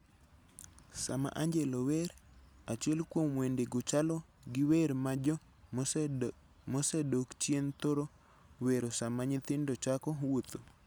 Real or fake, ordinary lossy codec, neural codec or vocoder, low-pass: real; none; none; none